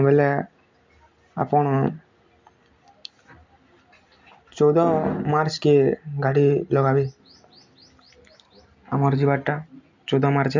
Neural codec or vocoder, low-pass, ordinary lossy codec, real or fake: none; 7.2 kHz; MP3, 64 kbps; real